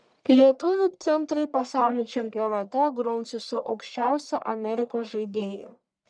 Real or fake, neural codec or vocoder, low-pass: fake; codec, 44.1 kHz, 1.7 kbps, Pupu-Codec; 9.9 kHz